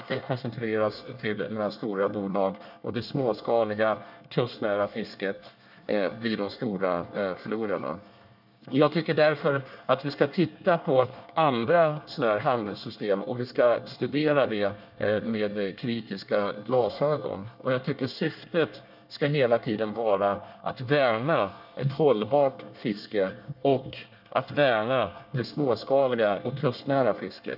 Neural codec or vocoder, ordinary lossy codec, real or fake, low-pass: codec, 24 kHz, 1 kbps, SNAC; none; fake; 5.4 kHz